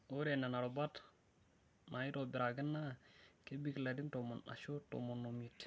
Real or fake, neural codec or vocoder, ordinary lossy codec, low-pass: real; none; none; none